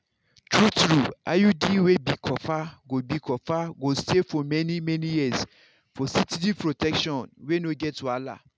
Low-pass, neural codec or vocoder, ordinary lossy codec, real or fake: none; none; none; real